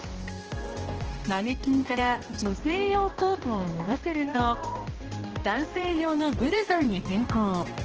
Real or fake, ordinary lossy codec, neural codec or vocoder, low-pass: fake; Opus, 16 kbps; codec, 16 kHz, 1 kbps, X-Codec, HuBERT features, trained on balanced general audio; 7.2 kHz